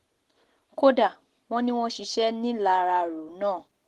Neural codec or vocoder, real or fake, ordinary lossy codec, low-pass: none; real; Opus, 16 kbps; 14.4 kHz